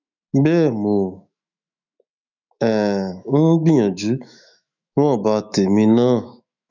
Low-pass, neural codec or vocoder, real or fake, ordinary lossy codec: 7.2 kHz; autoencoder, 48 kHz, 128 numbers a frame, DAC-VAE, trained on Japanese speech; fake; none